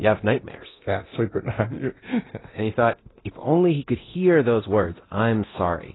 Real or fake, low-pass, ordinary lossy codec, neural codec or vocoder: fake; 7.2 kHz; AAC, 16 kbps; codec, 24 kHz, 0.9 kbps, DualCodec